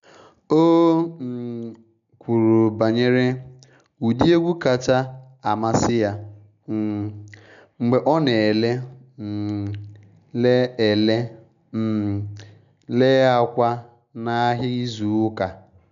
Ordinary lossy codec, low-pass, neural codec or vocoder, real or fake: none; 7.2 kHz; none; real